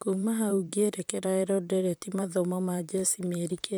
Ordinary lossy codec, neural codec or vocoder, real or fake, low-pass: none; vocoder, 44.1 kHz, 128 mel bands, Pupu-Vocoder; fake; none